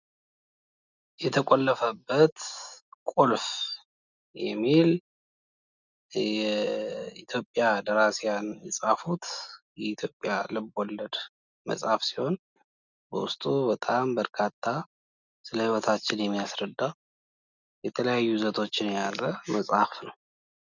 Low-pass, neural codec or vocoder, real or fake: 7.2 kHz; none; real